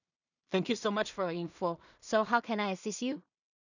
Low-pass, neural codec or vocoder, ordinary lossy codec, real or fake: 7.2 kHz; codec, 16 kHz in and 24 kHz out, 0.4 kbps, LongCat-Audio-Codec, two codebook decoder; none; fake